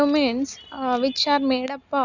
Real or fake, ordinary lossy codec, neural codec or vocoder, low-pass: real; none; none; 7.2 kHz